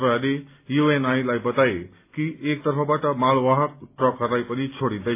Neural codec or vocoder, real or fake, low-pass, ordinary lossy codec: none; real; 3.6 kHz; AAC, 24 kbps